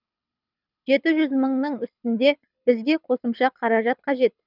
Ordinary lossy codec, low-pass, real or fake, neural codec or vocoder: none; 5.4 kHz; fake; codec, 24 kHz, 6 kbps, HILCodec